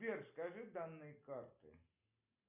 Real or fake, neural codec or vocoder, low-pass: real; none; 3.6 kHz